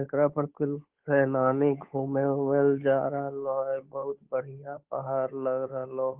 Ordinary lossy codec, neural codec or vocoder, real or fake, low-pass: Opus, 32 kbps; codec, 16 kHz, 8 kbps, FunCodec, trained on Chinese and English, 25 frames a second; fake; 3.6 kHz